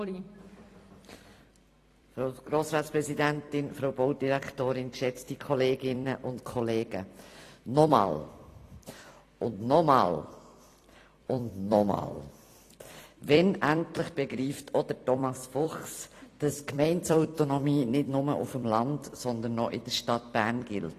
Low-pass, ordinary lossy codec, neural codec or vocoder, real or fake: 14.4 kHz; AAC, 48 kbps; vocoder, 44.1 kHz, 128 mel bands every 256 samples, BigVGAN v2; fake